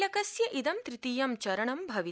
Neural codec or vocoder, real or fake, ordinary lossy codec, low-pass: none; real; none; none